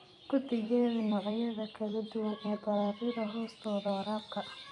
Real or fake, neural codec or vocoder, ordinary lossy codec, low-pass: fake; vocoder, 44.1 kHz, 128 mel bands every 256 samples, BigVGAN v2; none; 10.8 kHz